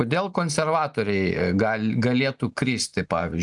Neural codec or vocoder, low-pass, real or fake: none; 10.8 kHz; real